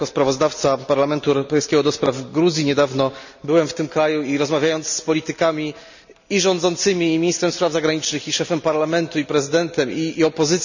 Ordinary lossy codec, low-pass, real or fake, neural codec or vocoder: none; 7.2 kHz; real; none